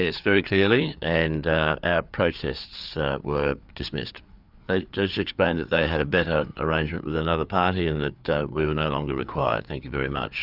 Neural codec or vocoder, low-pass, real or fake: codec, 16 kHz, 4 kbps, FreqCodec, larger model; 5.4 kHz; fake